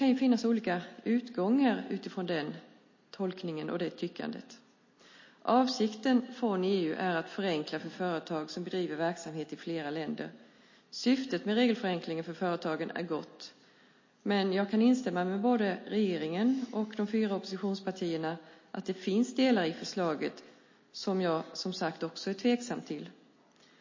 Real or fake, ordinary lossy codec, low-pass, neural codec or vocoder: real; MP3, 32 kbps; 7.2 kHz; none